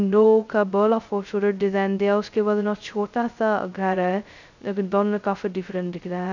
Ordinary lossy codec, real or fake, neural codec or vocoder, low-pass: none; fake; codec, 16 kHz, 0.2 kbps, FocalCodec; 7.2 kHz